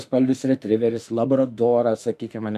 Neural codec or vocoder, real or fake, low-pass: autoencoder, 48 kHz, 32 numbers a frame, DAC-VAE, trained on Japanese speech; fake; 14.4 kHz